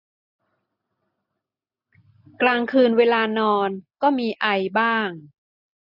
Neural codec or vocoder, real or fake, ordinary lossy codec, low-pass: none; real; AAC, 48 kbps; 5.4 kHz